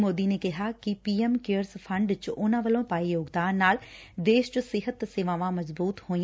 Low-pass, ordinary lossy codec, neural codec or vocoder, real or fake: none; none; none; real